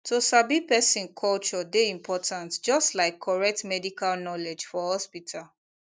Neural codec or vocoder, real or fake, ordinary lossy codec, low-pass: none; real; none; none